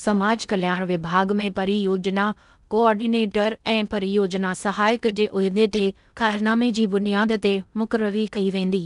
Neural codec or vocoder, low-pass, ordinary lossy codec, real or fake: codec, 16 kHz in and 24 kHz out, 0.6 kbps, FocalCodec, streaming, 4096 codes; 10.8 kHz; none; fake